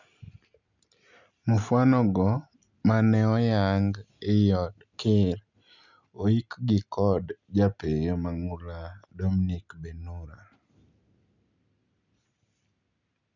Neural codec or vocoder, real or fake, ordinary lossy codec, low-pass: none; real; none; 7.2 kHz